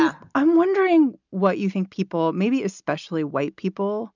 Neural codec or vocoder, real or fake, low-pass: none; real; 7.2 kHz